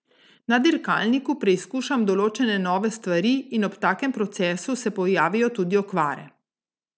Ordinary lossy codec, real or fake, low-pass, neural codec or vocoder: none; real; none; none